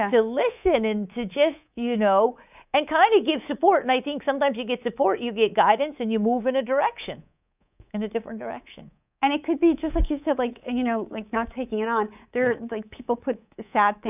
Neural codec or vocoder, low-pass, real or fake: codec, 24 kHz, 3.1 kbps, DualCodec; 3.6 kHz; fake